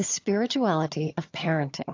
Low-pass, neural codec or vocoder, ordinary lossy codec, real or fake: 7.2 kHz; vocoder, 22.05 kHz, 80 mel bands, HiFi-GAN; AAC, 48 kbps; fake